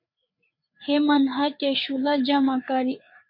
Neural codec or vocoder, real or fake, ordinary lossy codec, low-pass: codec, 16 kHz, 4 kbps, FreqCodec, larger model; fake; MP3, 32 kbps; 5.4 kHz